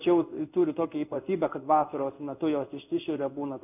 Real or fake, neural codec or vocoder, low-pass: fake; codec, 16 kHz in and 24 kHz out, 1 kbps, XY-Tokenizer; 3.6 kHz